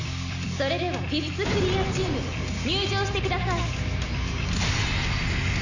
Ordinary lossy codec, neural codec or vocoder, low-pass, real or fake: none; none; 7.2 kHz; real